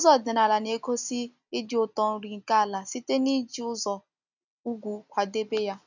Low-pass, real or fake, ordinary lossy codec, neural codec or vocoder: 7.2 kHz; real; none; none